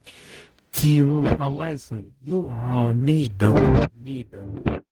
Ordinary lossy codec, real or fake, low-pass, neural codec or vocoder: Opus, 24 kbps; fake; 19.8 kHz; codec, 44.1 kHz, 0.9 kbps, DAC